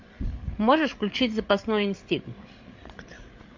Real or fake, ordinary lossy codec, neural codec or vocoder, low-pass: fake; MP3, 48 kbps; codec, 16 kHz, 8 kbps, FreqCodec, larger model; 7.2 kHz